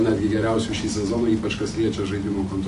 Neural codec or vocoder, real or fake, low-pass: none; real; 10.8 kHz